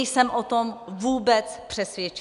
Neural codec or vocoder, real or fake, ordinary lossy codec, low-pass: none; real; MP3, 96 kbps; 10.8 kHz